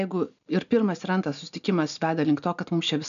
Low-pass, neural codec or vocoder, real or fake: 7.2 kHz; none; real